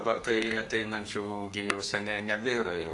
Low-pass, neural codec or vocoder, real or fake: 10.8 kHz; codec, 32 kHz, 1.9 kbps, SNAC; fake